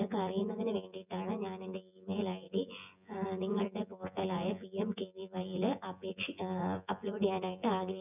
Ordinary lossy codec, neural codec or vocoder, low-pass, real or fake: none; vocoder, 24 kHz, 100 mel bands, Vocos; 3.6 kHz; fake